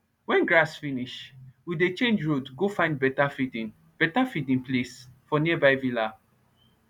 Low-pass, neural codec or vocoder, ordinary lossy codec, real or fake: 19.8 kHz; none; none; real